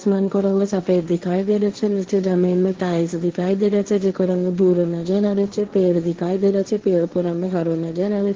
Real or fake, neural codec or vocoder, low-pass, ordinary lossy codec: fake; codec, 16 kHz, 1.1 kbps, Voila-Tokenizer; 7.2 kHz; Opus, 16 kbps